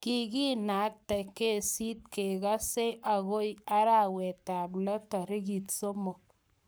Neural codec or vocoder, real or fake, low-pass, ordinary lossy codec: codec, 44.1 kHz, 7.8 kbps, Pupu-Codec; fake; none; none